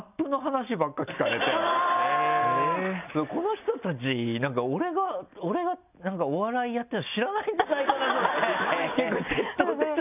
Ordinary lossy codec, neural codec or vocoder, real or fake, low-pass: none; none; real; 3.6 kHz